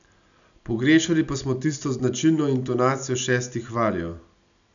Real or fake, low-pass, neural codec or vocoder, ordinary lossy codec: real; 7.2 kHz; none; none